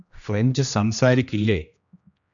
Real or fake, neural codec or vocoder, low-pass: fake; codec, 16 kHz, 1 kbps, X-Codec, HuBERT features, trained on general audio; 7.2 kHz